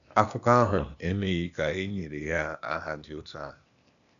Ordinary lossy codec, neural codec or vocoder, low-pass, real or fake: none; codec, 16 kHz, 0.8 kbps, ZipCodec; 7.2 kHz; fake